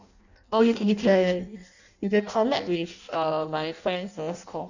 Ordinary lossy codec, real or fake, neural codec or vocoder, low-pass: none; fake; codec, 16 kHz in and 24 kHz out, 0.6 kbps, FireRedTTS-2 codec; 7.2 kHz